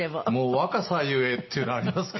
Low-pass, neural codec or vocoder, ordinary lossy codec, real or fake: 7.2 kHz; none; MP3, 24 kbps; real